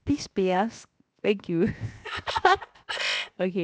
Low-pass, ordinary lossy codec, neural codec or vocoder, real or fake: none; none; codec, 16 kHz, 0.7 kbps, FocalCodec; fake